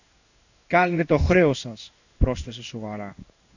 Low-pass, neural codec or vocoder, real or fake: 7.2 kHz; codec, 16 kHz in and 24 kHz out, 1 kbps, XY-Tokenizer; fake